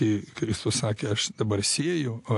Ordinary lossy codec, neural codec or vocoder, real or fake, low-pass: AAC, 64 kbps; none; real; 10.8 kHz